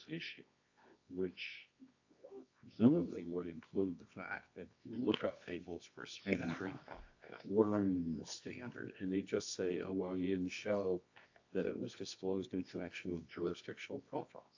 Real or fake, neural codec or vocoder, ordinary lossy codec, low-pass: fake; codec, 24 kHz, 0.9 kbps, WavTokenizer, medium music audio release; AAC, 48 kbps; 7.2 kHz